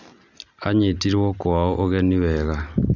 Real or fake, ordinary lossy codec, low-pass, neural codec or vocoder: real; none; 7.2 kHz; none